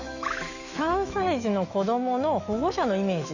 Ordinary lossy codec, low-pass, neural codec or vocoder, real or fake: Opus, 64 kbps; 7.2 kHz; autoencoder, 48 kHz, 128 numbers a frame, DAC-VAE, trained on Japanese speech; fake